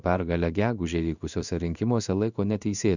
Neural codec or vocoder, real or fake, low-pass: codec, 16 kHz in and 24 kHz out, 1 kbps, XY-Tokenizer; fake; 7.2 kHz